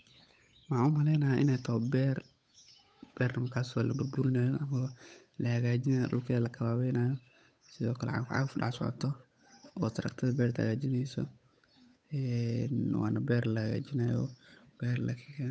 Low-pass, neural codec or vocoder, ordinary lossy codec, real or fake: none; codec, 16 kHz, 8 kbps, FunCodec, trained on Chinese and English, 25 frames a second; none; fake